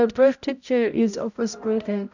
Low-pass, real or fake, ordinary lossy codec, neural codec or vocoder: 7.2 kHz; fake; none; codec, 16 kHz, 0.5 kbps, X-Codec, HuBERT features, trained on balanced general audio